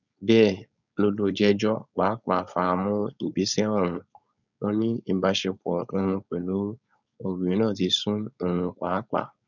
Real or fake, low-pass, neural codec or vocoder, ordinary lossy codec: fake; 7.2 kHz; codec, 16 kHz, 4.8 kbps, FACodec; none